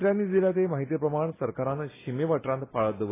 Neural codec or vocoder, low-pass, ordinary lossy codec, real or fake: none; 3.6 kHz; AAC, 16 kbps; real